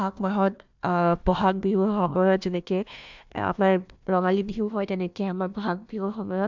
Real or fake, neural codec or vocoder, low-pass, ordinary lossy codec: fake; codec, 16 kHz, 1 kbps, FunCodec, trained on Chinese and English, 50 frames a second; 7.2 kHz; MP3, 64 kbps